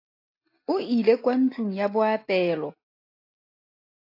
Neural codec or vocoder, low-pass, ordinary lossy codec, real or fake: none; 5.4 kHz; AAC, 32 kbps; real